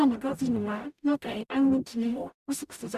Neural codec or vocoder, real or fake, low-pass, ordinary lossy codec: codec, 44.1 kHz, 0.9 kbps, DAC; fake; 14.4 kHz; none